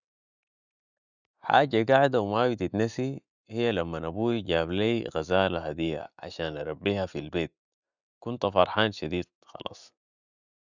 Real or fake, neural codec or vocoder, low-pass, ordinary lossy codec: real; none; 7.2 kHz; none